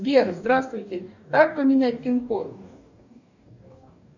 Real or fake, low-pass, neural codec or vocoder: fake; 7.2 kHz; codec, 44.1 kHz, 2.6 kbps, DAC